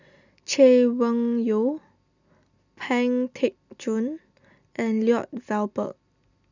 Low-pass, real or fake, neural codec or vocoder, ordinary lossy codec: 7.2 kHz; real; none; none